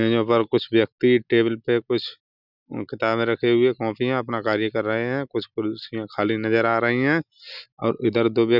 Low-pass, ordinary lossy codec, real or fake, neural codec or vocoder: 5.4 kHz; none; real; none